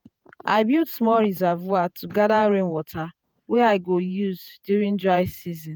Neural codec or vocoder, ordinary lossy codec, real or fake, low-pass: vocoder, 48 kHz, 128 mel bands, Vocos; none; fake; none